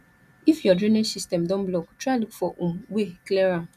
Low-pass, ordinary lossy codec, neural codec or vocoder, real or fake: 14.4 kHz; none; none; real